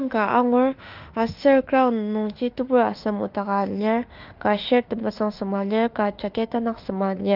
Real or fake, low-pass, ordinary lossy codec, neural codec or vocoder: fake; 5.4 kHz; Opus, 32 kbps; autoencoder, 48 kHz, 32 numbers a frame, DAC-VAE, trained on Japanese speech